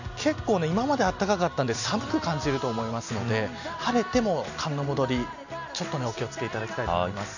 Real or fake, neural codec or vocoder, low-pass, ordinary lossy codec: real; none; 7.2 kHz; none